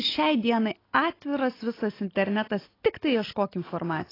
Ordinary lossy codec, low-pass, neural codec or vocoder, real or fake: AAC, 24 kbps; 5.4 kHz; none; real